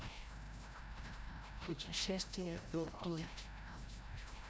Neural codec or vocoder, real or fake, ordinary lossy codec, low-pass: codec, 16 kHz, 0.5 kbps, FreqCodec, larger model; fake; none; none